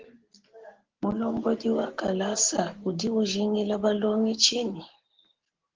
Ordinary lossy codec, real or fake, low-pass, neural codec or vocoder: Opus, 16 kbps; real; 7.2 kHz; none